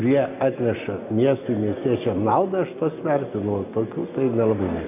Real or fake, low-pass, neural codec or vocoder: real; 3.6 kHz; none